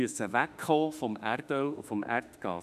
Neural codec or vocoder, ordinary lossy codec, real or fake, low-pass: autoencoder, 48 kHz, 32 numbers a frame, DAC-VAE, trained on Japanese speech; none; fake; 14.4 kHz